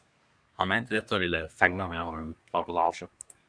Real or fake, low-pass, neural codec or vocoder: fake; 9.9 kHz; codec, 24 kHz, 1 kbps, SNAC